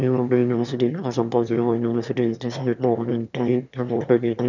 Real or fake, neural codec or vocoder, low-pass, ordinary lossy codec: fake; autoencoder, 22.05 kHz, a latent of 192 numbers a frame, VITS, trained on one speaker; 7.2 kHz; none